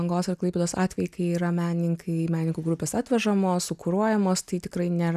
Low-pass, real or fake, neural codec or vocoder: 14.4 kHz; real; none